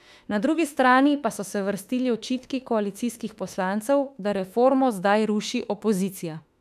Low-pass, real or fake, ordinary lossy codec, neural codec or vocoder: 14.4 kHz; fake; none; autoencoder, 48 kHz, 32 numbers a frame, DAC-VAE, trained on Japanese speech